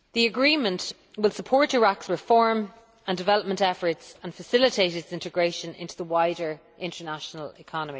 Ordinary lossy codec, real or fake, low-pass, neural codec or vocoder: none; real; none; none